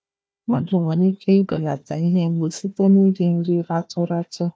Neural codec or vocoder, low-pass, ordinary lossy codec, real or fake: codec, 16 kHz, 1 kbps, FunCodec, trained on Chinese and English, 50 frames a second; none; none; fake